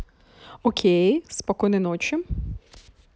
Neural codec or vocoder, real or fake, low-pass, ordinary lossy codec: none; real; none; none